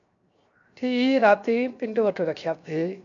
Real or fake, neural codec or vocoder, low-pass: fake; codec, 16 kHz, 0.7 kbps, FocalCodec; 7.2 kHz